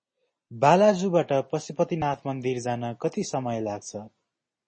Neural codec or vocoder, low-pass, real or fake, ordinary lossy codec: none; 9.9 kHz; real; MP3, 32 kbps